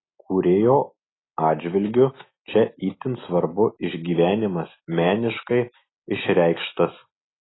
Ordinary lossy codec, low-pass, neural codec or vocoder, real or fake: AAC, 16 kbps; 7.2 kHz; none; real